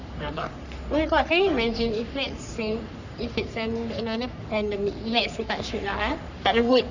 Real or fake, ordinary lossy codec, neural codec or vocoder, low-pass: fake; none; codec, 44.1 kHz, 3.4 kbps, Pupu-Codec; 7.2 kHz